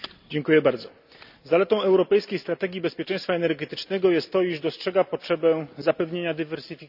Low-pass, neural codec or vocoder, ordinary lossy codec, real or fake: 5.4 kHz; none; none; real